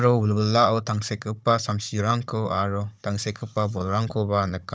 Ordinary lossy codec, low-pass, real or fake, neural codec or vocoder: none; none; fake; codec, 16 kHz, 16 kbps, FunCodec, trained on Chinese and English, 50 frames a second